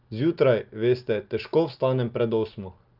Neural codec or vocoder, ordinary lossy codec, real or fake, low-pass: none; Opus, 32 kbps; real; 5.4 kHz